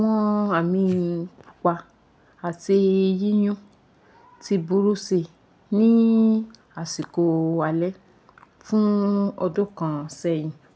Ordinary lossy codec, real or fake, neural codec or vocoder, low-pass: none; real; none; none